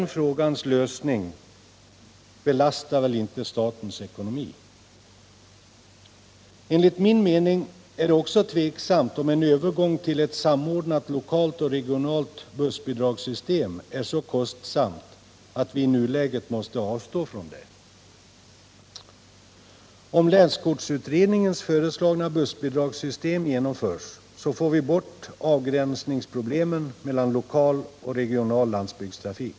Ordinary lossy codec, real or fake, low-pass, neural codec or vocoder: none; real; none; none